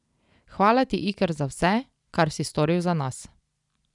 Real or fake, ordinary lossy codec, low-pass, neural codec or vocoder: real; none; 10.8 kHz; none